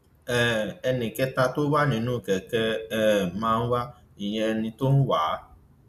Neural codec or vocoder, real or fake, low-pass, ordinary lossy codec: none; real; 14.4 kHz; none